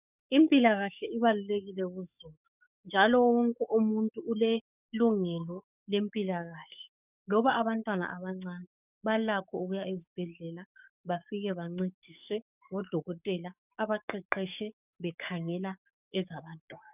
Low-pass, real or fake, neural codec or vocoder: 3.6 kHz; fake; codec, 44.1 kHz, 7.8 kbps, DAC